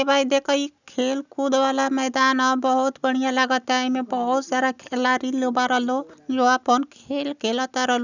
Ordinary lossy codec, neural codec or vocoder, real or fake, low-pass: none; none; real; 7.2 kHz